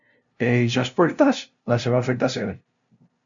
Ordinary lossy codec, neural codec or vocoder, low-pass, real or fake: MP3, 64 kbps; codec, 16 kHz, 0.5 kbps, FunCodec, trained on LibriTTS, 25 frames a second; 7.2 kHz; fake